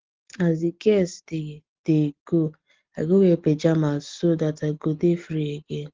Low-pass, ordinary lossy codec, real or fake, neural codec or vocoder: 7.2 kHz; Opus, 16 kbps; real; none